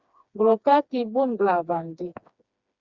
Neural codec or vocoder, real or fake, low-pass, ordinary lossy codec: codec, 16 kHz, 2 kbps, FreqCodec, smaller model; fake; 7.2 kHz; Opus, 64 kbps